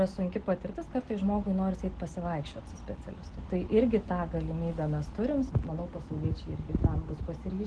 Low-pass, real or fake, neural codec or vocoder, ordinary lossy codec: 10.8 kHz; real; none; Opus, 16 kbps